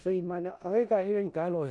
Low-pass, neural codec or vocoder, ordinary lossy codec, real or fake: 10.8 kHz; codec, 16 kHz in and 24 kHz out, 0.4 kbps, LongCat-Audio-Codec, four codebook decoder; Opus, 64 kbps; fake